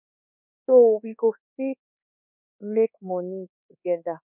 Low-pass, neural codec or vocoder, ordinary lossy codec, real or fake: 3.6 kHz; codec, 24 kHz, 1.2 kbps, DualCodec; none; fake